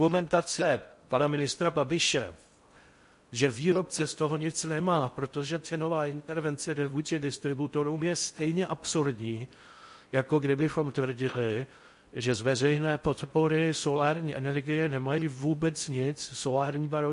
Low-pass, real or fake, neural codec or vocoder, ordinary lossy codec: 10.8 kHz; fake; codec, 16 kHz in and 24 kHz out, 0.6 kbps, FocalCodec, streaming, 4096 codes; MP3, 48 kbps